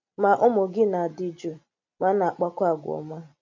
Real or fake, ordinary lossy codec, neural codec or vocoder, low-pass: real; MP3, 64 kbps; none; 7.2 kHz